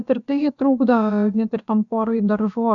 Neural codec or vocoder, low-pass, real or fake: codec, 16 kHz, about 1 kbps, DyCAST, with the encoder's durations; 7.2 kHz; fake